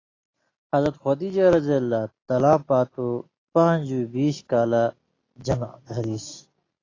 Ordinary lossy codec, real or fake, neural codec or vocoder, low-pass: AAC, 32 kbps; real; none; 7.2 kHz